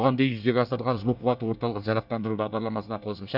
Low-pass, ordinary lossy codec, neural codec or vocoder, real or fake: 5.4 kHz; none; codec, 24 kHz, 1 kbps, SNAC; fake